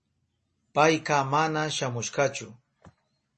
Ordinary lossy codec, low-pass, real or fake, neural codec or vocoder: MP3, 32 kbps; 10.8 kHz; real; none